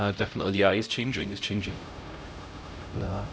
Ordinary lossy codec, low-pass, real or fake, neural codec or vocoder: none; none; fake; codec, 16 kHz, 0.5 kbps, X-Codec, HuBERT features, trained on LibriSpeech